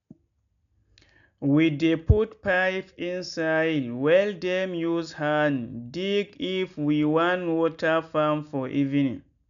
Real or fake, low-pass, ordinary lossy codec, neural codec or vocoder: real; 7.2 kHz; none; none